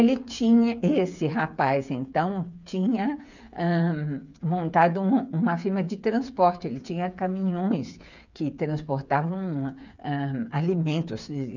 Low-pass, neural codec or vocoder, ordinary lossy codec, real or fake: 7.2 kHz; codec, 16 kHz, 8 kbps, FreqCodec, smaller model; none; fake